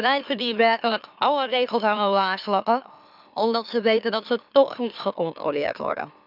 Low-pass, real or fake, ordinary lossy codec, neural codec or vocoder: 5.4 kHz; fake; none; autoencoder, 44.1 kHz, a latent of 192 numbers a frame, MeloTTS